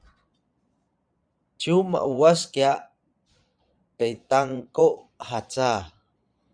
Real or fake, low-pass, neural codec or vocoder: fake; 9.9 kHz; vocoder, 22.05 kHz, 80 mel bands, Vocos